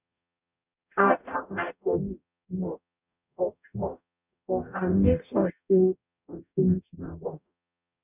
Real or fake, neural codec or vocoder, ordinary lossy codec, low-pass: fake; codec, 44.1 kHz, 0.9 kbps, DAC; none; 3.6 kHz